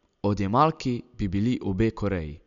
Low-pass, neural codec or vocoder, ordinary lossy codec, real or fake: 7.2 kHz; none; none; real